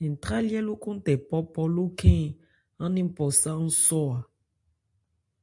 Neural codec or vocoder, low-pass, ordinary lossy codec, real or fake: none; 10.8 kHz; AAC, 64 kbps; real